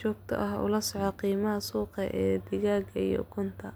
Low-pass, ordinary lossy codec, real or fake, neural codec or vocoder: none; none; real; none